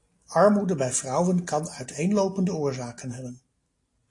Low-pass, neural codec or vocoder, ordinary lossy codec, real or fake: 10.8 kHz; none; AAC, 48 kbps; real